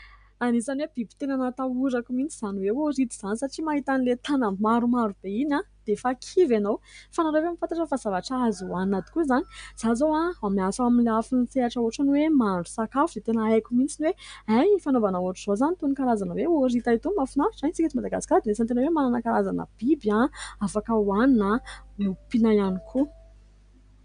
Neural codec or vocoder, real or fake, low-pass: none; real; 9.9 kHz